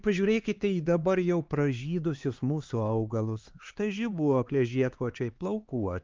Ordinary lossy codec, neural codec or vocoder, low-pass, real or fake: Opus, 32 kbps; codec, 16 kHz, 4 kbps, X-Codec, HuBERT features, trained on LibriSpeech; 7.2 kHz; fake